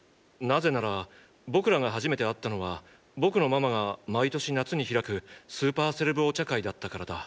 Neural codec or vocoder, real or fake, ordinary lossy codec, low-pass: none; real; none; none